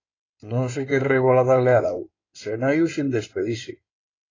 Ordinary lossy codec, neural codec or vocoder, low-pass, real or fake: AAC, 32 kbps; codec, 16 kHz in and 24 kHz out, 2.2 kbps, FireRedTTS-2 codec; 7.2 kHz; fake